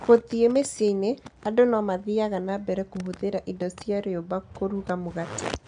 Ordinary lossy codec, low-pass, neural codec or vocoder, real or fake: none; 9.9 kHz; none; real